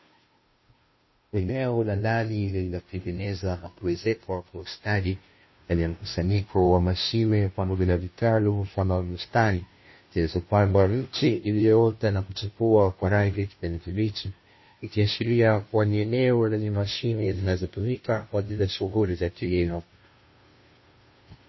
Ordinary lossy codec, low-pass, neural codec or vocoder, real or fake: MP3, 24 kbps; 7.2 kHz; codec, 16 kHz, 0.5 kbps, FunCodec, trained on Chinese and English, 25 frames a second; fake